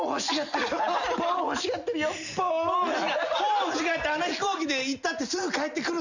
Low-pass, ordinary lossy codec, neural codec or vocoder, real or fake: 7.2 kHz; none; none; real